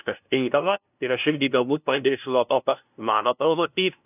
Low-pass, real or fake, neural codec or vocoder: 3.6 kHz; fake; codec, 16 kHz, 0.5 kbps, FunCodec, trained on LibriTTS, 25 frames a second